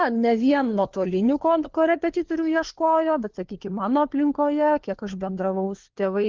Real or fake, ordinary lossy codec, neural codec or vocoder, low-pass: fake; Opus, 16 kbps; codec, 16 kHz, 4 kbps, FunCodec, trained on LibriTTS, 50 frames a second; 7.2 kHz